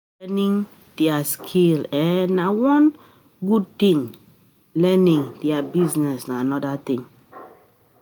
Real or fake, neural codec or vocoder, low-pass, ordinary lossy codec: real; none; none; none